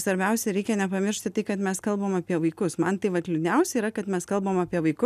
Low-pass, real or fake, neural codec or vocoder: 14.4 kHz; real; none